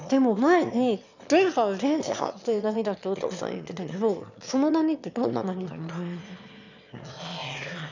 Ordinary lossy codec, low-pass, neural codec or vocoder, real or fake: none; 7.2 kHz; autoencoder, 22.05 kHz, a latent of 192 numbers a frame, VITS, trained on one speaker; fake